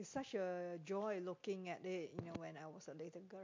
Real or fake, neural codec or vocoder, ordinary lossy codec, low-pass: real; none; MP3, 48 kbps; 7.2 kHz